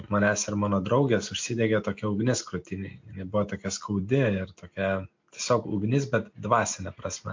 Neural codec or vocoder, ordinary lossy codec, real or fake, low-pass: none; MP3, 48 kbps; real; 7.2 kHz